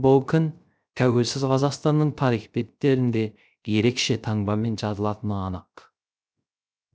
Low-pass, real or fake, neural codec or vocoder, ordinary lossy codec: none; fake; codec, 16 kHz, 0.3 kbps, FocalCodec; none